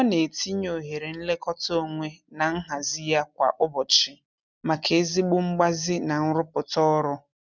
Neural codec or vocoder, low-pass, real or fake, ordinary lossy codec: none; 7.2 kHz; real; none